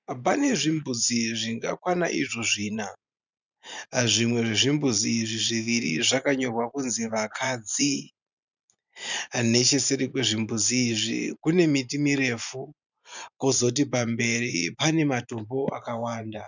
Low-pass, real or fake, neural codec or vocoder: 7.2 kHz; fake; vocoder, 44.1 kHz, 128 mel bands every 512 samples, BigVGAN v2